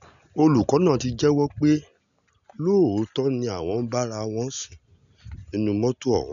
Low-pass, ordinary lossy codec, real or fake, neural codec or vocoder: 7.2 kHz; none; real; none